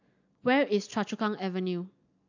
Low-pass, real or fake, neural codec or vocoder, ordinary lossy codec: 7.2 kHz; real; none; AAC, 48 kbps